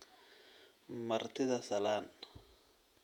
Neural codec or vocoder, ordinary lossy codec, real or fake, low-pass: vocoder, 48 kHz, 128 mel bands, Vocos; none; fake; 19.8 kHz